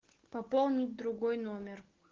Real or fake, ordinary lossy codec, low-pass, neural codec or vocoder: real; Opus, 16 kbps; 7.2 kHz; none